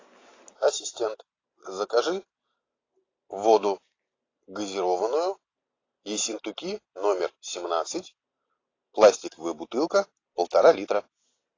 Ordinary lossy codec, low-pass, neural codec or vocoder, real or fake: AAC, 32 kbps; 7.2 kHz; none; real